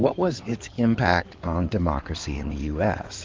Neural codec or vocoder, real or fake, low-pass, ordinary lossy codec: codec, 16 kHz in and 24 kHz out, 2.2 kbps, FireRedTTS-2 codec; fake; 7.2 kHz; Opus, 32 kbps